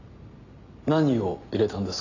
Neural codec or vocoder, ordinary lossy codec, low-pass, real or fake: none; none; 7.2 kHz; real